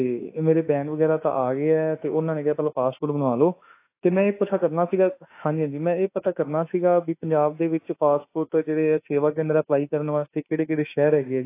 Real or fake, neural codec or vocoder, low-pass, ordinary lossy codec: fake; autoencoder, 48 kHz, 32 numbers a frame, DAC-VAE, trained on Japanese speech; 3.6 kHz; AAC, 24 kbps